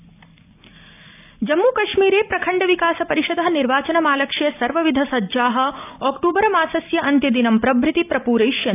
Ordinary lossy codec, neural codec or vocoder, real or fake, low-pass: AAC, 32 kbps; none; real; 3.6 kHz